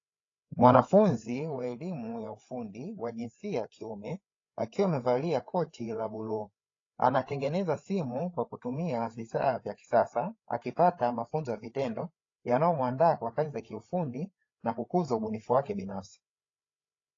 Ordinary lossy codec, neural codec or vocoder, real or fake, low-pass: AAC, 32 kbps; codec, 16 kHz, 8 kbps, FreqCodec, larger model; fake; 7.2 kHz